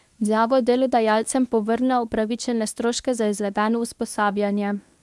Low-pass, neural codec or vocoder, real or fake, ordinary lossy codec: none; codec, 24 kHz, 0.9 kbps, WavTokenizer, medium speech release version 1; fake; none